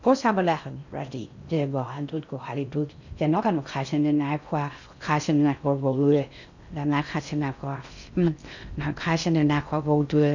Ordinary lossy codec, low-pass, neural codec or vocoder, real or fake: none; 7.2 kHz; codec, 16 kHz in and 24 kHz out, 0.6 kbps, FocalCodec, streaming, 2048 codes; fake